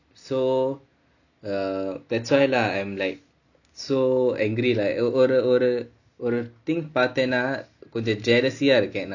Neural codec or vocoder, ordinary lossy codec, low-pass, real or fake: none; none; 7.2 kHz; real